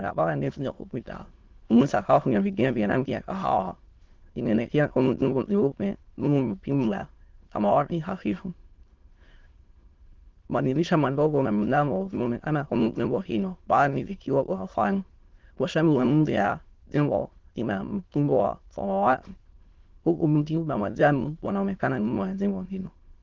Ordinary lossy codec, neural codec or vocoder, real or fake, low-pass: Opus, 16 kbps; autoencoder, 22.05 kHz, a latent of 192 numbers a frame, VITS, trained on many speakers; fake; 7.2 kHz